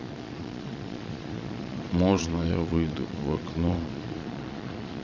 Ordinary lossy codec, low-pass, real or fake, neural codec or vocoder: none; 7.2 kHz; fake; vocoder, 22.05 kHz, 80 mel bands, Vocos